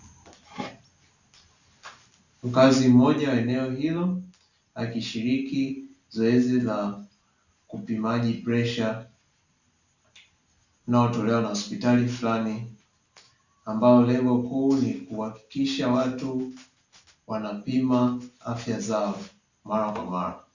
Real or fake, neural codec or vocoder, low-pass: real; none; 7.2 kHz